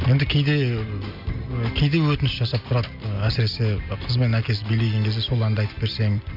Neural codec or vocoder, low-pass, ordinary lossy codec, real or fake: none; 5.4 kHz; none; real